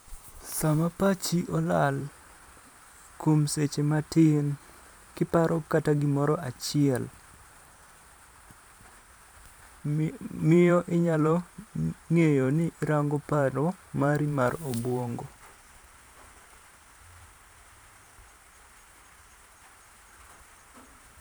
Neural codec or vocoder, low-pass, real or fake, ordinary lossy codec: vocoder, 44.1 kHz, 128 mel bands every 512 samples, BigVGAN v2; none; fake; none